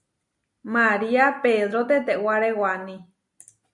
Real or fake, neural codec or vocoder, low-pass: real; none; 10.8 kHz